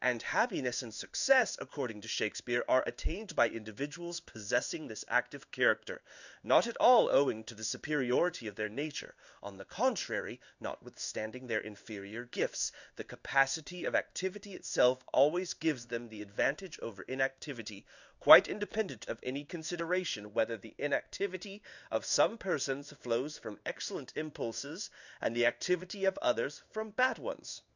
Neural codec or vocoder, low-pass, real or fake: codec, 16 kHz in and 24 kHz out, 1 kbps, XY-Tokenizer; 7.2 kHz; fake